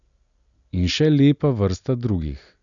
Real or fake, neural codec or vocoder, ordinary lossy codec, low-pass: real; none; none; 7.2 kHz